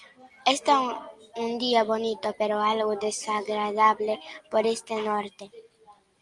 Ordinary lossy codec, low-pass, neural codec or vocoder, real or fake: Opus, 32 kbps; 10.8 kHz; none; real